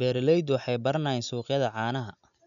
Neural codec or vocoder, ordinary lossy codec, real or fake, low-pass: none; none; real; 7.2 kHz